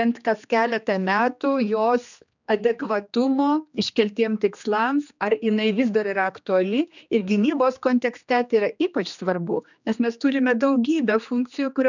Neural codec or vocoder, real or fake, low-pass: codec, 16 kHz, 2 kbps, X-Codec, HuBERT features, trained on general audio; fake; 7.2 kHz